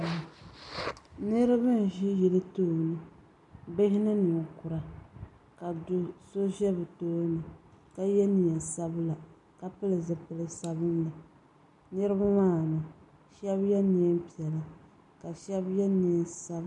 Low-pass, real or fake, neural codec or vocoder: 10.8 kHz; real; none